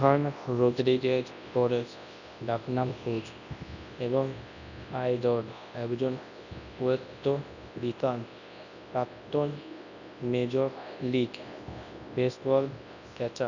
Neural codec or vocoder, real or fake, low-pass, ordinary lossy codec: codec, 24 kHz, 0.9 kbps, WavTokenizer, large speech release; fake; 7.2 kHz; none